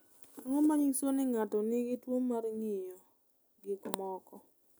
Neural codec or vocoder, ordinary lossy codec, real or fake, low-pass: none; none; real; none